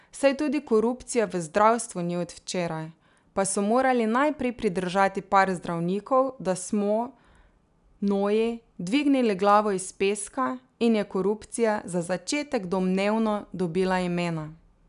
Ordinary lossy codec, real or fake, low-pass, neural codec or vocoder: none; real; 10.8 kHz; none